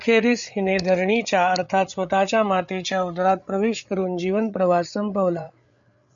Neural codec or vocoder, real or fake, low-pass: codec, 16 kHz, 8 kbps, FreqCodec, larger model; fake; 7.2 kHz